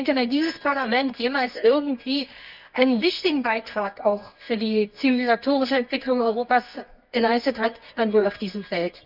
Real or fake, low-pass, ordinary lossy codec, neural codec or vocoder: fake; 5.4 kHz; none; codec, 24 kHz, 0.9 kbps, WavTokenizer, medium music audio release